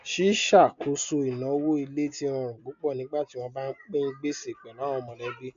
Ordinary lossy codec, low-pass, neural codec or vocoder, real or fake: MP3, 64 kbps; 7.2 kHz; none; real